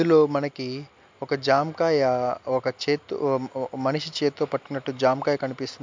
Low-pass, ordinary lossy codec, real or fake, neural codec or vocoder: 7.2 kHz; MP3, 64 kbps; real; none